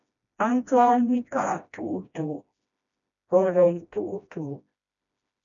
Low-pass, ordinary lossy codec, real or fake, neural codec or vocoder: 7.2 kHz; MP3, 96 kbps; fake; codec, 16 kHz, 1 kbps, FreqCodec, smaller model